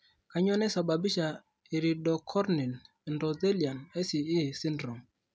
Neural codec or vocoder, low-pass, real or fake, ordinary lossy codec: none; none; real; none